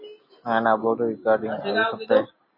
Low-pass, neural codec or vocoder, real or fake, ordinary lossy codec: 5.4 kHz; none; real; MP3, 24 kbps